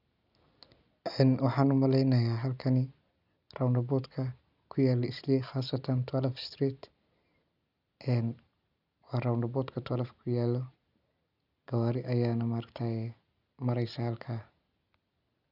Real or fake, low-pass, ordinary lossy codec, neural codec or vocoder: real; 5.4 kHz; none; none